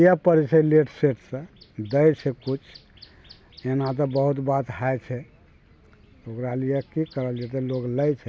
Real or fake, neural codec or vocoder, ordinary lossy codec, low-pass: real; none; none; none